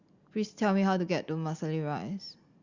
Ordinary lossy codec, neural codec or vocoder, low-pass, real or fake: Opus, 64 kbps; none; 7.2 kHz; real